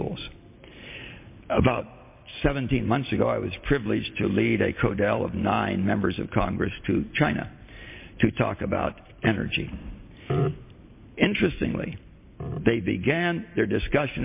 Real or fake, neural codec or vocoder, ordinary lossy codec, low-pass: real; none; MP3, 24 kbps; 3.6 kHz